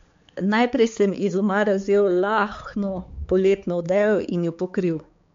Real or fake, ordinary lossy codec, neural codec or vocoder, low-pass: fake; MP3, 48 kbps; codec, 16 kHz, 4 kbps, X-Codec, HuBERT features, trained on balanced general audio; 7.2 kHz